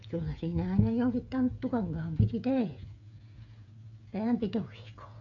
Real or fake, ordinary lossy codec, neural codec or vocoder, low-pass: fake; none; codec, 16 kHz, 16 kbps, FreqCodec, smaller model; 7.2 kHz